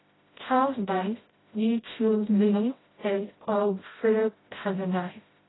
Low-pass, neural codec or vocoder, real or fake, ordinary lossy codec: 7.2 kHz; codec, 16 kHz, 0.5 kbps, FreqCodec, smaller model; fake; AAC, 16 kbps